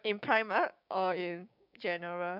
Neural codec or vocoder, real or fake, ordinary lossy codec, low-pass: codec, 16 kHz, 6 kbps, DAC; fake; none; 5.4 kHz